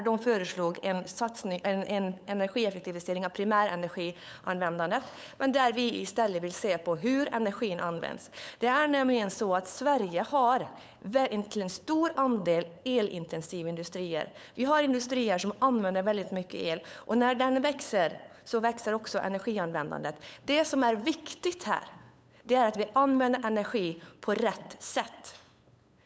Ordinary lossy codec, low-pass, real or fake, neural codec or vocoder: none; none; fake; codec, 16 kHz, 8 kbps, FunCodec, trained on LibriTTS, 25 frames a second